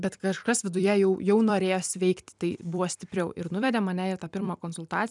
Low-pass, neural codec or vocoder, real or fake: 10.8 kHz; vocoder, 44.1 kHz, 128 mel bands every 256 samples, BigVGAN v2; fake